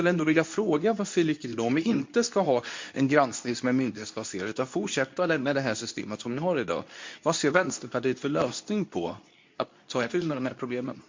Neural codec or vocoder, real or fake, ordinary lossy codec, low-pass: codec, 24 kHz, 0.9 kbps, WavTokenizer, medium speech release version 2; fake; MP3, 48 kbps; 7.2 kHz